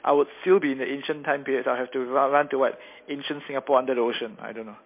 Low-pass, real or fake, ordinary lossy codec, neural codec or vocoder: 3.6 kHz; real; MP3, 24 kbps; none